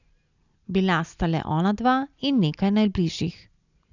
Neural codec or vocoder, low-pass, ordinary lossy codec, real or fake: none; 7.2 kHz; none; real